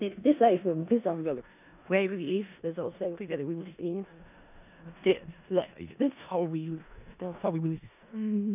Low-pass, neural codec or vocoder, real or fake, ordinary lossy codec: 3.6 kHz; codec, 16 kHz in and 24 kHz out, 0.4 kbps, LongCat-Audio-Codec, four codebook decoder; fake; none